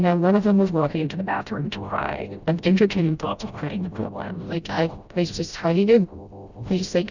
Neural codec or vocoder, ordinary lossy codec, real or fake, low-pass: codec, 16 kHz, 0.5 kbps, FreqCodec, smaller model; Opus, 64 kbps; fake; 7.2 kHz